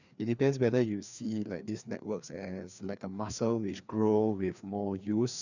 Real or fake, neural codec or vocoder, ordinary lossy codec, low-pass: fake; codec, 16 kHz, 2 kbps, FreqCodec, larger model; none; 7.2 kHz